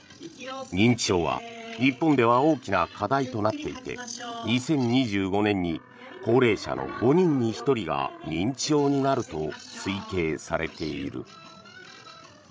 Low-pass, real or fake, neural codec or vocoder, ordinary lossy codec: none; fake; codec, 16 kHz, 16 kbps, FreqCodec, larger model; none